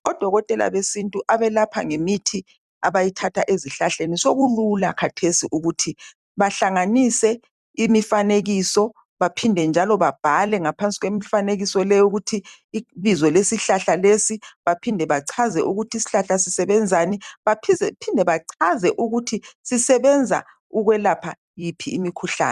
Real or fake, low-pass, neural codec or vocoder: fake; 14.4 kHz; vocoder, 44.1 kHz, 128 mel bands every 256 samples, BigVGAN v2